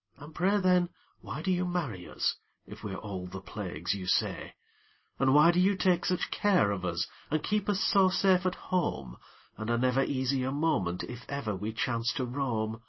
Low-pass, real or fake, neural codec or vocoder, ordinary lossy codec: 7.2 kHz; real; none; MP3, 24 kbps